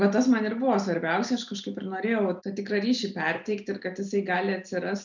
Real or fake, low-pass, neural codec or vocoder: real; 7.2 kHz; none